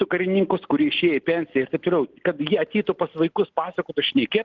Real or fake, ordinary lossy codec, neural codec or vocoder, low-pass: real; Opus, 16 kbps; none; 7.2 kHz